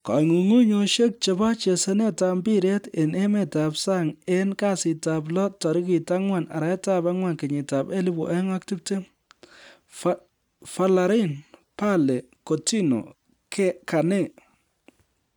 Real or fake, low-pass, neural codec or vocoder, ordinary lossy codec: real; 19.8 kHz; none; none